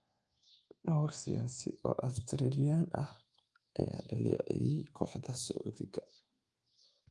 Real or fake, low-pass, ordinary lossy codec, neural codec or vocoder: fake; 10.8 kHz; Opus, 24 kbps; codec, 24 kHz, 1.2 kbps, DualCodec